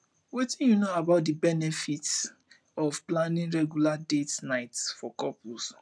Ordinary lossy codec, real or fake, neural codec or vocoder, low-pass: none; real; none; none